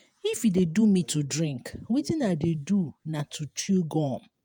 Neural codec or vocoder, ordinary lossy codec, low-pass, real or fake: vocoder, 48 kHz, 128 mel bands, Vocos; none; none; fake